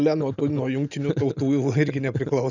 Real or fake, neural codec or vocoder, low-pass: real; none; 7.2 kHz